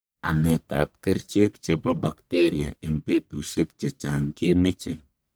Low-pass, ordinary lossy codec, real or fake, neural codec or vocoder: none; none; fake; codec, 44.1 kHz, 1.7 kbps, Pupu-Codec